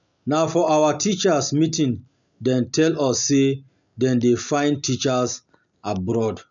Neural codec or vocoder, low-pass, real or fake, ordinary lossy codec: none; 7.2 kHz; real; none